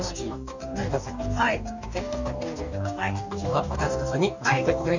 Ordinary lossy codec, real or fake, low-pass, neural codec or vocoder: none; fake; 7.2 kHz; codec, 44.1 kHz, 2.6 kbps, DAC